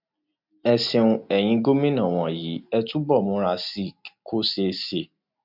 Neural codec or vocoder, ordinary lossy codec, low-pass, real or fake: none; MP3, 48 kbps; 5.4 kHz; real